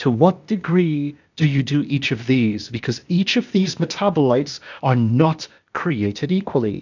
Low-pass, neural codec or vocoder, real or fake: 7.2 kHz; codec, 16 kHz, 0.8 kbps, ZipCodec; fake